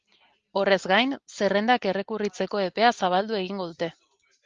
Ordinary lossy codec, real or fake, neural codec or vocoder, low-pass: Opus, 32 kbps; real; none; 7.2 kHz